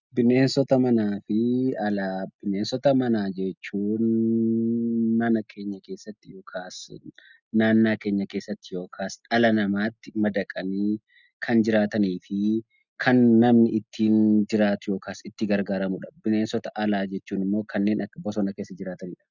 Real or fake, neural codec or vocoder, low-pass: real; none; 7.2 kHz